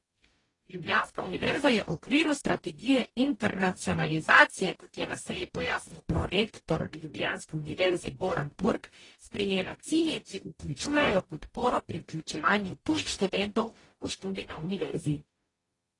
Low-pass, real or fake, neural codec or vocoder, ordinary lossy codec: 10.8 kHz; fake; codec, 44.1 kHz, 0.9 kbps, DAC; AAC, 32 kbps